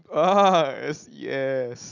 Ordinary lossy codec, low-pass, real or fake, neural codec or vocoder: none; 7.2 kHz; real; none